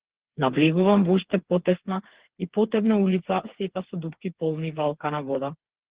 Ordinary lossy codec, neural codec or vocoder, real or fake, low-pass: Opus, 16 kbps; codec, 16 kHz, 4 kbps, FreqCodec, smaller model; fake; 3.6 kHz